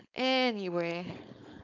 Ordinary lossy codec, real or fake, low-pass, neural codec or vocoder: none; fake; 7.2 kHz; codec, 16 kHz, 4.8 kbps, FACodec